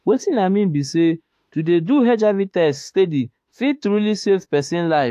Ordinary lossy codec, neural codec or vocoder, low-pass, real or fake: AAC, 64 kbps; autoencoder, 48 kHz, 32 numbers a frame, DAC-VAE, trained on Japanese speech; 14.4 kHz; fake